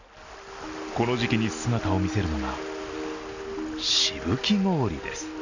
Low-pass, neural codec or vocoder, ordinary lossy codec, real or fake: 7.2 kHz; none; none; real